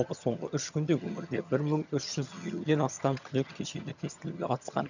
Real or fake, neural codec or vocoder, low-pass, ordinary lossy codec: fake; vocoder, 22.05 kHz, 80 mel bands, HiFi-GAN; 7.2 kHz; none